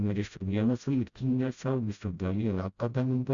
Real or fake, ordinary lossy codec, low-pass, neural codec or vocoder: fake; Opus, 64 kbps; 7.2 kHz; codec, 16 kHz, 0.5 kbps, FreqCodec, smaller model